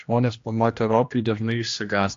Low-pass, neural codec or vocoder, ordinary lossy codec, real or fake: 7.2 kHz; codec, 16 kHz, 1 kbps, X-Codec, HuBERT features, trained on general audio; AAC, 48 kbps; fake